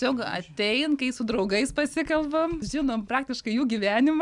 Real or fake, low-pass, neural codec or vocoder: real; 10.8 kHz; none